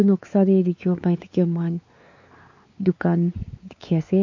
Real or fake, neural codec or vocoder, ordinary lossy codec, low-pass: fake; codec, 16 kHz, 4 kbps, X-Codec, WavLM features, trained on Multilingual LibriSpeech; MP3, 48 kbps; 7.2 kHz